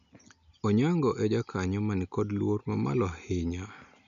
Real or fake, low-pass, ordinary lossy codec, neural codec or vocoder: real; 7.2 kHz; none; none